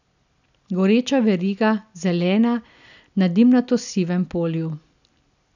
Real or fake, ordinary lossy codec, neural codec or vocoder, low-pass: real; none; none; 7.2 kHz